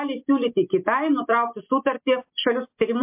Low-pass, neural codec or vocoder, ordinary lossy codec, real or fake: 3.6 kHz; none; MP3, 32 kbps; real